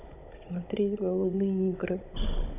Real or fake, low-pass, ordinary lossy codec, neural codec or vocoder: fake; 3.6 kHz; none; codec, 16 kHz, 16 kbps, FunCodec, trained on Chinese and English, 50 frames a second